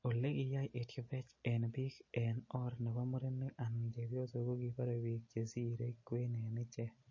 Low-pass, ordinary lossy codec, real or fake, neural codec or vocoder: 7.2 kHz; MP3, 32 kbps; real; none